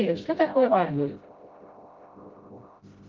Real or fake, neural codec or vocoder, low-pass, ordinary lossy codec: fake; codec, 16 kHz, 0.5 kbps, FreqCodec, smaller model; 7.2 kHz; Opus, 32 kbps